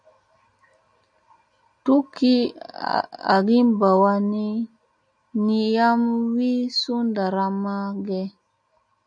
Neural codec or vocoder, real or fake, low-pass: none; real; 9.9 kHz